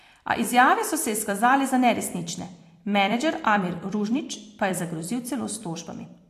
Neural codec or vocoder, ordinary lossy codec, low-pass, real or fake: vocoder, 44.1 kHz, 128 mel bands every 256 samples, BigVGAN v2; AAC, 64 kbps; 14.4 kHz; fake